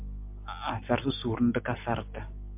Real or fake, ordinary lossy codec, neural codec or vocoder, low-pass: real; MP3, 32 kbps; none; 3.6 kHz